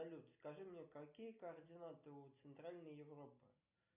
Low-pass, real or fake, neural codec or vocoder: 3.6 kHz; real; none